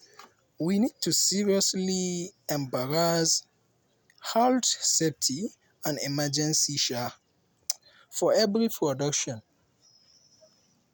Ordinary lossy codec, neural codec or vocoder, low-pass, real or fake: none; none; none; real